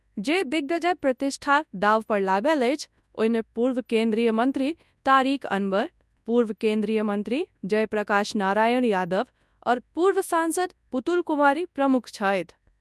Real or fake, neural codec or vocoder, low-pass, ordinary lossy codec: fake; codec, 24 kHz, 0.9 kbps, WavTokenizer, large speech release; none; none